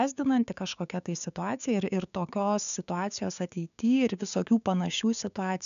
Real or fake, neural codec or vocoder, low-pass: fake; codec, 16 kHz, 6 kbps, DAC; 7.2 kHz